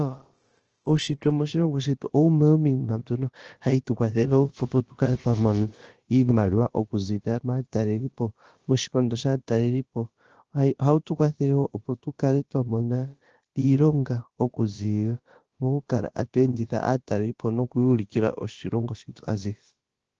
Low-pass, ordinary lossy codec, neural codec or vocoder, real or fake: 7.2 kHz; Opus, 16 kbps; codec, 16 kHz, about 1 kbps, DyCAST, with the encoder's durations; fake